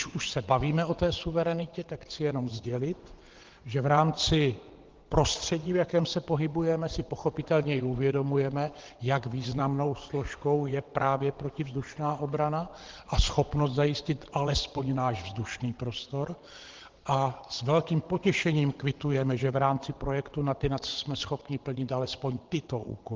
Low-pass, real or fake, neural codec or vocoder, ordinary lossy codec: 7.2 kHz; fake; vocoder, 22.05 kHz, 80 mel bands, WaveNeXt; Opus, 16 kbps